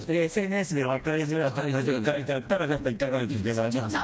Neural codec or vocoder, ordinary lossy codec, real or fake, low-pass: codec, 16 kHz, 1 kbps, FreqCodec, smaller model; none; fake; none